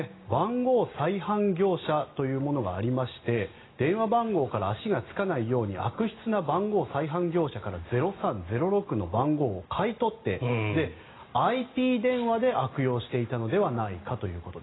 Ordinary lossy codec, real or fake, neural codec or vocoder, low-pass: AAC, 16 kbps; real; none; 7.2 kHz